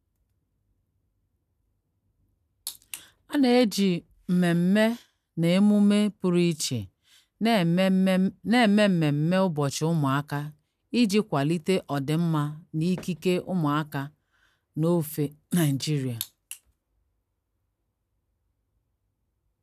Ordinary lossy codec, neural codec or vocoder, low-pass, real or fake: none; none; 14.4 kHz; real